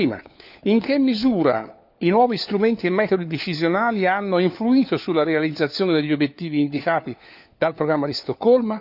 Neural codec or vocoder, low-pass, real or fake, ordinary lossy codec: codec, 16 kHz, 4 kbps, FunCodec, trained on LibriTTS, 50 frames a second; 5.4 kHz; fake; none